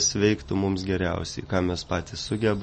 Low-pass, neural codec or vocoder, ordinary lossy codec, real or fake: 10.8 kHz; none; MP3, 32 kbps; real